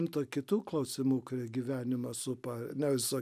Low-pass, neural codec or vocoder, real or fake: 14.4 kHz; none; real